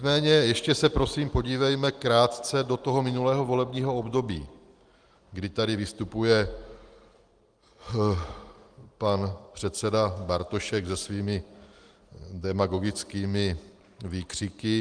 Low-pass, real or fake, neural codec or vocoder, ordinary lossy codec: 9.9 kHz; real; none; Opus, 24 kbps